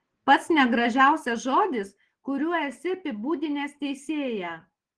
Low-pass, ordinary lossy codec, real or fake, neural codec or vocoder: 9.9 kHz; Opus, 16 kbps; real; none